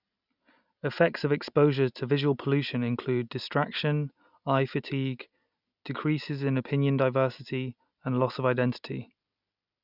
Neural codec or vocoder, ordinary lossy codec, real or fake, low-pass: none; none; real; 5.4 kHz